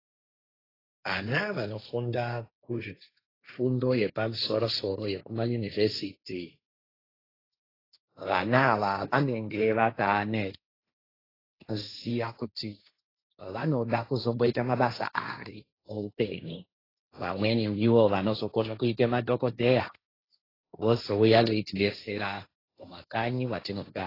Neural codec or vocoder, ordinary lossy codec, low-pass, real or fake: codec, 16 kHz, 1.1 kbps, Voila-Tokenizer; AAC, 24 kbps; 5.4 kHz; fake